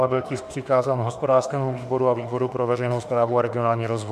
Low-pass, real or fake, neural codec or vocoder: 14.4 kHz; fake; autoencoder, 48 kHz, 32 numbers a frame, DAC-VAE, trained on Japanese speech